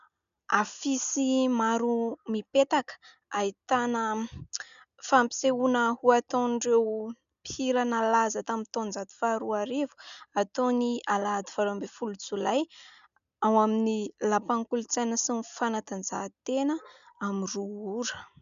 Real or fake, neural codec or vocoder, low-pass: real; none; 7.2 kHz